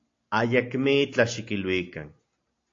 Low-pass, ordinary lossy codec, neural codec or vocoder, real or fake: 7.2 kHz; AAC, 48 kbps; none; real